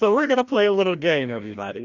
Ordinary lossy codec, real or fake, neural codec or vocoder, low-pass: Opus, 64 kbps; fake; codec, 16 kHz, 1 kbps, FreqCodec, larger model; 7.2 kHz